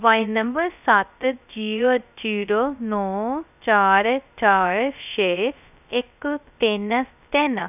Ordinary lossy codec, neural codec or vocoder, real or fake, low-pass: none; codec, 16 kHz, 0.2 kbps, FocalCodec; fake; 3.6 kHz